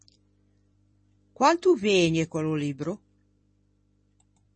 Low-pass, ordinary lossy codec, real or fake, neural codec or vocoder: 10.8 kHz; MP3, 32 kbps; fake; vocoder, 44.1 kHz, 128 mel bands every 256 samples, BigVGAN v2